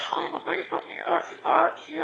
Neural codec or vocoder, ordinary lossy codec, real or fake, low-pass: autoencoder, 22.05 kHz, a latent of 192 numbers a frame, VITS, trained on one speaker; AAC, 32 kbps; fake; 9.9 kHz